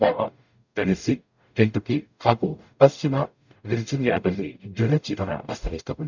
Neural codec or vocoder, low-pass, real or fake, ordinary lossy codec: codec, 44.1 kHz, 0.9 kbps, DAC; 7.2 kHz; fake; none